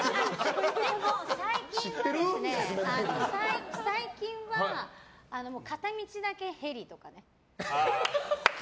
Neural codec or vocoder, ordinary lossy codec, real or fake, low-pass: none; none; real; none